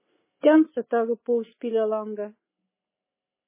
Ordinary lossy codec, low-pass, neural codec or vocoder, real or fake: MP3, 16 kbps; 3.6 kHz; none; real